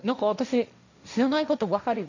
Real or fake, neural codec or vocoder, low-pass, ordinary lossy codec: fake; codec, 16 kHz, 1.1 kbps, Voila-Tokenizer; 7.2 kHz; none